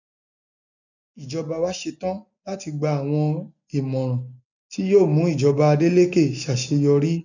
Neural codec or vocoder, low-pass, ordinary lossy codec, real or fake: none; 7.2 kHz; none; real